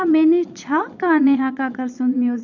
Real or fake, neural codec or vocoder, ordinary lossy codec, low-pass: fake; vocoder, 22.05 kHz, 80 mel bands, Vocos; none; 7.2 kHz